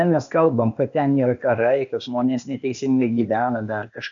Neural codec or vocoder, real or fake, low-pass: codec, 16 kHz, 0.8 kbps, ZipCodec; fake; 7.2 kHz